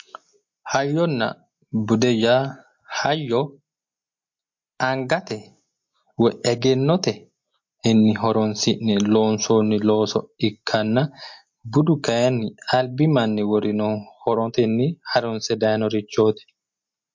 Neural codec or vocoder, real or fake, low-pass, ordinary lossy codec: none; real; 7.2 kHz; MP3, 48 kbps